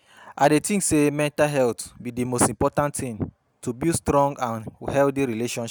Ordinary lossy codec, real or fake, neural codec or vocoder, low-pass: none; real; none; none